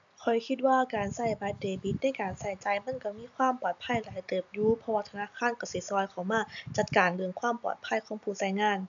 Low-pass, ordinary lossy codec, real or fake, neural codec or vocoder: 7.2 kHz; AAC, 64 kbps; real; none